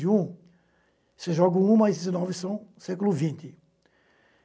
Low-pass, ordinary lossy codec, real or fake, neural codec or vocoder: none; none; real; none